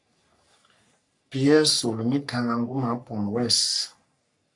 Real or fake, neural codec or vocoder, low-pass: fake; codec, 44.1 kHz, 3.4 kbps, Pupu-Codec; 10.8 kHz